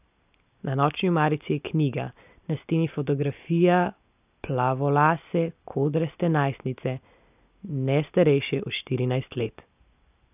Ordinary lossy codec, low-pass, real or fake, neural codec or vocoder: none; 3.6 kHz; real; none